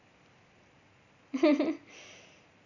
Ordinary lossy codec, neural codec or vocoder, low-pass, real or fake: none; none; 7.2 kHz; real